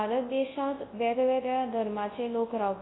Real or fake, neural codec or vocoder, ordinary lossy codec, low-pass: fake; codec, 24 kHz, 0.9 kbps, WavTokenizer, large speech release; AAC, 16 kbps; 7.2 kHz